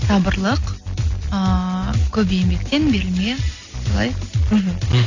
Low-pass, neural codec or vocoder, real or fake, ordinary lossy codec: 7.2 kHz; none; real; AAC, 48 kbps